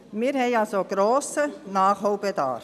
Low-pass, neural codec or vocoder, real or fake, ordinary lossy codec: 14.4 kHz; none; real; none